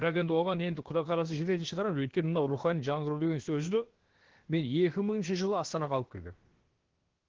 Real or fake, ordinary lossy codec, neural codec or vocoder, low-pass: fake; Opus, 16 kbps; codec, 16 kHz, about 1 kbps, DyCAST, with the encoder's durations; 7.2 kHz